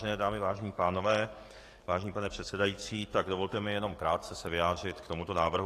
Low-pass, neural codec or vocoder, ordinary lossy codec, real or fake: 14.4 kHz; codec, 44.1 kHz, 7.8 kbps, DAC; AAC, 48 kbps; fake